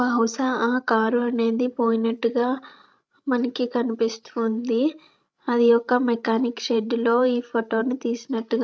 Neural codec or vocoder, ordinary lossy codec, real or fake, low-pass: codec, 16 kHz, 16 kbps, FreqCodec, larger model; none; fake; none